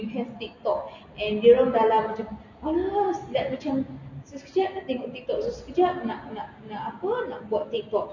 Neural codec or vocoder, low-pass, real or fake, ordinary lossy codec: none; 7.2 kHz; real; none